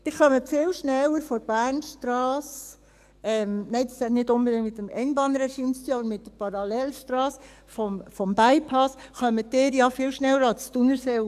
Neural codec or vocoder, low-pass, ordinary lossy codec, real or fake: codec, 44.1 kHz, 7.8 kbps, DAC; 14.4 kHz; none; fake